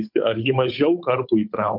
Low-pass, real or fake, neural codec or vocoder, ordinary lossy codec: 5.4 kHz; fake; codec, 16 kHz, 8 kbps, FunCodec, trained on Chinese and English, 25 frames a second; MP3, 48 kbps